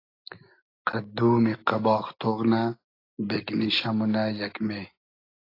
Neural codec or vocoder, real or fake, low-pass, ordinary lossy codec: none; real; 5.4 kHz; AAC, 32 kbps